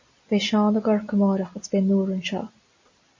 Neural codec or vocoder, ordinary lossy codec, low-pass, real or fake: none; MP3, 32 kbps; 7.2 kHz; real